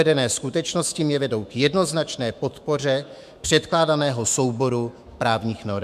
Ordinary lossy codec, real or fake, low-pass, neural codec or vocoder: MP3, 96 kbps; fake; 14.4 kHz; autoencoder, 48 kHz, 128 numbers a frame, DAC-VAE, trained on Japanese speech